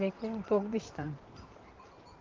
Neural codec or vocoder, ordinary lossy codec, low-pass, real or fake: vocoder, 44.1 kHz, 128 mel bands, Pupu-Vocoder; Opus, 32 kbps; 7.2 kHz; fake